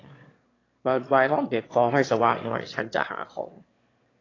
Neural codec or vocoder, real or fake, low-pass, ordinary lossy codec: autoencoder, 22.05 kHz, a latent of 192 numbers a frame, VITS, trained on one speaker; fake; 7.2 kHz; AAC, 32 kbps